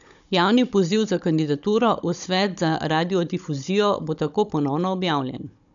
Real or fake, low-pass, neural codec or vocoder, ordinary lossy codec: fake; 7.2 kHz; codec, 16 kHz, 16 kbps, FunCodec, trained on Chinese and English, 50 frames a second; none